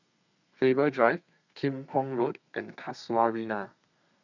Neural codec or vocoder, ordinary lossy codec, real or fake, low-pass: codec, 32 kHz, 1.9 kbps, SNAC; none; fake; 7.2 kHz